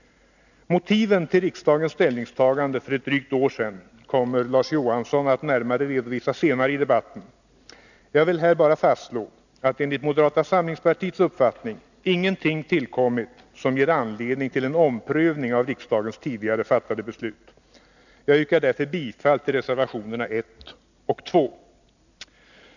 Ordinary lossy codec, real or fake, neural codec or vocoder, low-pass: none; real; none; 7.2 kHz